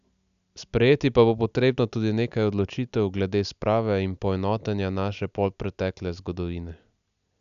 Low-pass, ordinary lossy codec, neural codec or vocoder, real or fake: 7.2 kHz; none; none; real